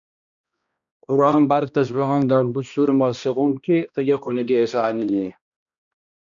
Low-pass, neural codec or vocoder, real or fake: 7.2 kHz; codec, 16 kHz, 1 kbps, X-Codec, HuBERT features, trained on balanced general audio; fake